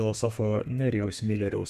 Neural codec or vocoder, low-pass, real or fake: codec, 32 kHz, 1.9 kbps, SNAC; 14.4 kHz; fake